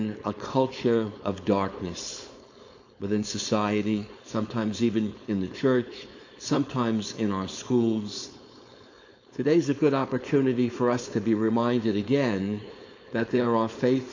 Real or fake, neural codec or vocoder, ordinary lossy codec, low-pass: fake; codec, 16 kHz, 4.8 kbps, FACodec; AAC, 48 kbps; 7.2 kHz